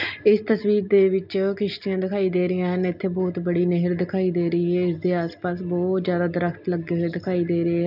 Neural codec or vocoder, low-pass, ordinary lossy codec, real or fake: none; 5.4 kHz; none; real